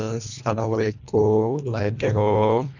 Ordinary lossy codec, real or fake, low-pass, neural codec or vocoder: none; fake; 7.2 kHz; codec, 24 kHz, 1.5 kbps, HILCodec